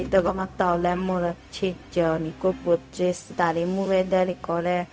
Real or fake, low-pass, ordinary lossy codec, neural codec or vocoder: fake; none; none; codec, 16 kHz, 0.4 kbps, LongCat-Audio-Codec